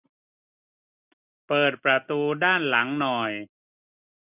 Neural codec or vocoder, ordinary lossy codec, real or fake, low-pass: none; none; real; 3.6 kHz